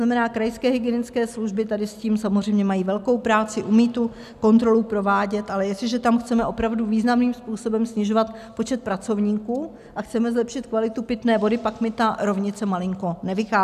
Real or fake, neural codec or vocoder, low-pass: real; none; 14.4 kHz